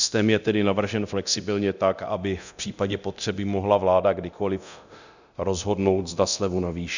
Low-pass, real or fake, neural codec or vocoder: 7.2 kHz; fake; codec, 24 kHz, 0.9 kbps, DualCodec